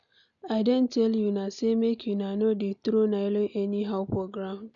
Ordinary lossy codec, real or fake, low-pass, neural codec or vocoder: none; real; 7.2 kHz; none